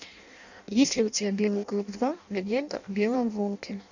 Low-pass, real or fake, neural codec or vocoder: 7.2 kHz; fake; codec, 16 kHz in and 24 kHz out, 0.6 kbps, FireRedTTS-2 codec